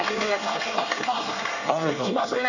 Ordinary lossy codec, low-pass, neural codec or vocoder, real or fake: none; 7.2 kHz; codec, 24 kHz, 1 kbps, SNAC; fake